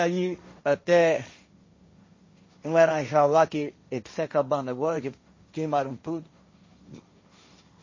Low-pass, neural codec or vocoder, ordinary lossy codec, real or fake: 7.2 kHz; codec, 16 kHz, 1.1 kbps, Voila-Tokenizer; MP3, 32 kbps; fake